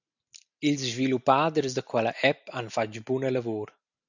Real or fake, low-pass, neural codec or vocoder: real; 7.2 kHz; none